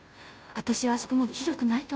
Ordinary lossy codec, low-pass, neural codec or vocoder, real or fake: none; none; codec, 16 kHz, 0.5 kbps, FunCodec, trained on Chinese and English, 25 frames a second; fake